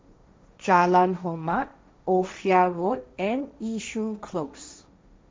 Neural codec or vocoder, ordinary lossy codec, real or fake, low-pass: codec, 16 kHz, 1.1 kbps, Voila-Tokenizer; none; fake; none